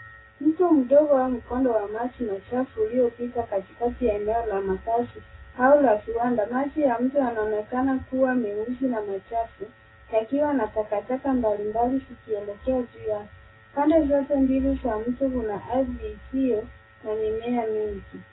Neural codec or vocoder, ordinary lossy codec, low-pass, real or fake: none; AAC, 16 kbps; 7.2 kHz; real